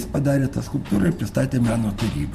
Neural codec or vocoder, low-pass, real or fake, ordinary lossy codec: vocoder, 44.1 kHz, 128 mel bands every 512 samples, BigVGAN v2; 14.4 kHz; fake; MP3, 64 kbps